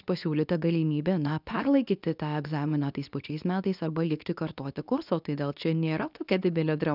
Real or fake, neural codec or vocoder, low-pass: fake; codec, 24 kHz, 0.9 kbps, WavTokenizer, medium speech release version 2; 5.4 kHz